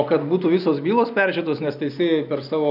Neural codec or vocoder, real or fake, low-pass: none; real; 5.4 kHz